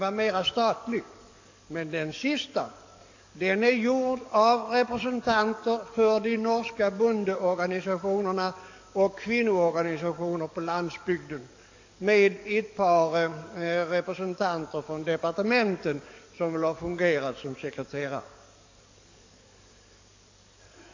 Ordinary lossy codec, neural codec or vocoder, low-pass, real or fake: AAC, 48 kbps; codec, 44.1 kHz, 7.8 kbps, DAC; 7.2 kHz; fake